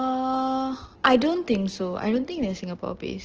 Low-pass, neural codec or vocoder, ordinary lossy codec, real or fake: 7.2 kHz; none; Opus, 16 kbps; real